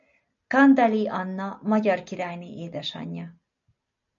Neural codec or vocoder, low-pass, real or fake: none; 7.2 kHz; real